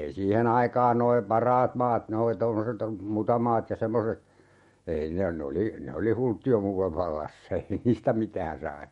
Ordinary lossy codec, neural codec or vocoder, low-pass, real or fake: MP3, 48 kbps; autoencoder, 48 kHz, 128 numbers a frame, DAC-VAE, trained on Japanese speech; 19.8 kHz; fake